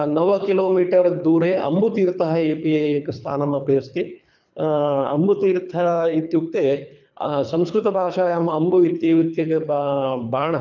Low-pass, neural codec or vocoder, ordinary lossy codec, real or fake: 7.2 kHz; codec, 24 kHz, 3 kbps, HILCodec; none; fake